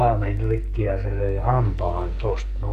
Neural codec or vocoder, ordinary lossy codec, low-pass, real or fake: codec, 44.1 kHz, 2.6 kbps, SNAC; none; 14.4 kHz; fake